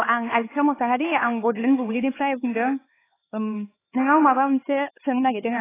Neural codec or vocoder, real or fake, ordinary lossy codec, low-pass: codec, 16 kHz, 4 kbps, X-Codec, HuBERT features, trained on LibriSpeech; fake; AAC, 16 kbps; 3.6 kHz